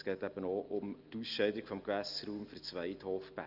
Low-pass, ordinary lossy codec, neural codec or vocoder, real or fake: 5.4 kHz; Opus, 32 kbps; none; real